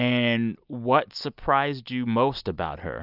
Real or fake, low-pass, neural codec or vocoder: real; 5.4 kHz; none